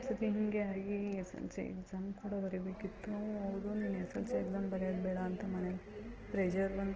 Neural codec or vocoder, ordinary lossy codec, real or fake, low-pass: none; Opus, 16 kbps; real; 7.2 kHz